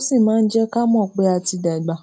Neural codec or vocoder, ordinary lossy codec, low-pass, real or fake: none; none; none; real